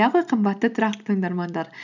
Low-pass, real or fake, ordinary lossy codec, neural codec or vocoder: 7.2 kHz; real; none; none